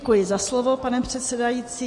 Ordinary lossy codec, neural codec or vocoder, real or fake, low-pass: MP3, 48 kbps; none; real; 10.8 kHz